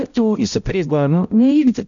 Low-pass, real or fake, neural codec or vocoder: 7.2 kHz; fake; codec, 16 kHz, 0.5 kbps, X-Codec, HuBERT features, trained on balanced general audio